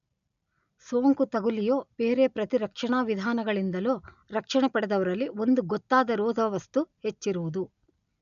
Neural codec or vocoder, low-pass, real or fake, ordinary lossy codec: none; 7.2 kHz; real; none